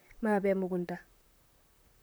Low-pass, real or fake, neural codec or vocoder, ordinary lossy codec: none; fake; vocoder, 44.1 kHz, 128 mel bands, Pupu-Vocoder; none